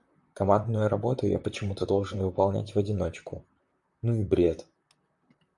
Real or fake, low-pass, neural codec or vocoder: fake; 10.8 kHz; vocoder, 44.1 kHz, 128 mel bands, Pupu-Vocoder